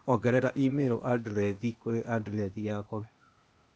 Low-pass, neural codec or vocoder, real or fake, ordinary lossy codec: none; codec, 16 kHz, 0.8 kbps, ZipCodec; fake; none